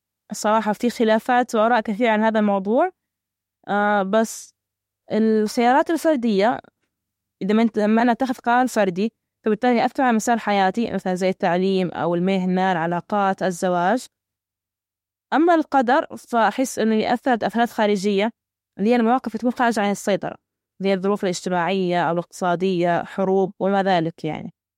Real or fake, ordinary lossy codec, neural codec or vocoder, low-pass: fake; MP3, 64 kbps; autoencoder, 48 kHz, 32 numbers a frame, DAC-VAE, trained on Japanese speech; 19.8 kHz